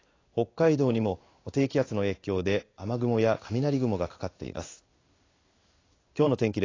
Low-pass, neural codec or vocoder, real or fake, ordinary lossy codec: 7.2 kHz; codec, 16 kHz in and 24 kHz out, 1 kbps, XY-Tokenizer; fake; AAC, 32 kbps